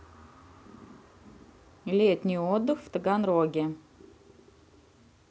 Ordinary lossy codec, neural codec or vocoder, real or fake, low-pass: none; none; real; none